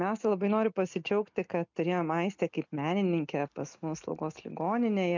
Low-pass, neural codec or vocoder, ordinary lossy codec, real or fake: 7.2 kHz; none; MP3, 64 kbps; real